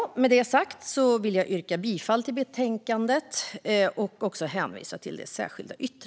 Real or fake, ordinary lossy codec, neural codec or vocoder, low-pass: real; none; none; none